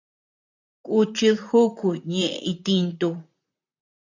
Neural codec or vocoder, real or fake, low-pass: vocoder, 44.1 kHz, 128 mel bands, Pupu-Vocoder; fake; 7.2 kHz